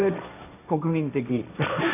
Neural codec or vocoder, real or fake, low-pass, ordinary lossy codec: codec, 16 kHz, 1.1 kbps, Voila-Tokenizer; fake; 3.6 kHz; none